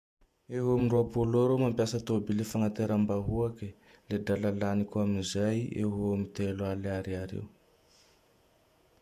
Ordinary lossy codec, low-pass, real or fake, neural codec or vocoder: MP3, 96 kbps; 14.4 kHz; fake; vocoder, 48 kHz, 128 mel bands, Vocos